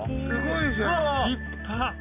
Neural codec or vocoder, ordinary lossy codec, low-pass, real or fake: none; none; 3.6 kHz; real